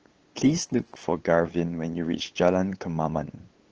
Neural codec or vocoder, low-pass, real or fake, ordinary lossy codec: codec, 16 kHz, 8 kbps, FunCodec, trained on LibriTTS, 25 frames a second; 7.2 kHz; fake; Opus, 16 kbps